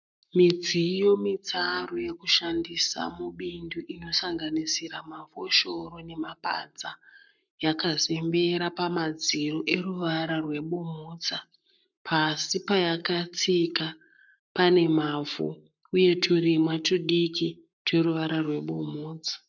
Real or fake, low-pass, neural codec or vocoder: fake; 7.2 kHz; codec, 44.1 kHz, 7.8 kbps, Pupu-Codec